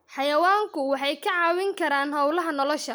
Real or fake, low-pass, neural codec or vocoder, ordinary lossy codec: real; none; none; none